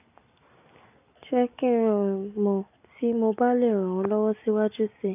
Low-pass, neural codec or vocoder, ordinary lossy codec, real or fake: 3.6 kHz; none; none; real